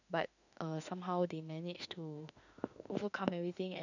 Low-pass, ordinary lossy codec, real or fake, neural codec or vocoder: 7.2 kHz; none; fake; autoencoder, 48 kHz, 32 numbers a frame, DAC-VAE, trained on Japanese speech